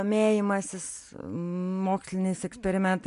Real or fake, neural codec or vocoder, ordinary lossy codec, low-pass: real; none; MP3, 64 kbps; 10.8 kHz